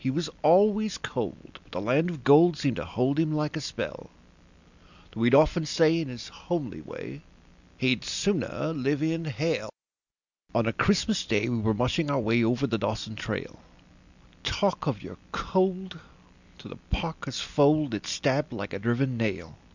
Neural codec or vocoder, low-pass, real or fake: none; 7.2 kHz; real